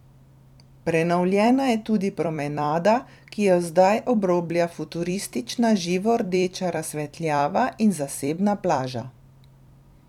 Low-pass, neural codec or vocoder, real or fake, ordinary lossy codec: 19.8 kHz; vocoder, 44.1 kHz, 128 mel bands every 256 samples, BigVGAN v2; fake; none